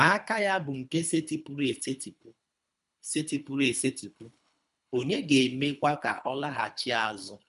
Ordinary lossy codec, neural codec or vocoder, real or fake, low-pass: none; codec, 24 kHz, 3 kbps, HILCodec; fake; 10.8 kHz